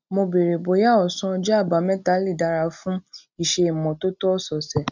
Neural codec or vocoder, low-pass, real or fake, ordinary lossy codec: none; 7.2 kHz; real; none